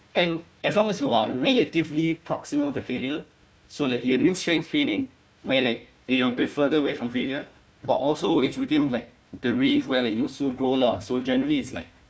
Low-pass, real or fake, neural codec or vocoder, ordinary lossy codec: none; fake; codec, 16 kHz, 1 kbps, FunCodec, trained on Chinese and English, 50 frames a second; none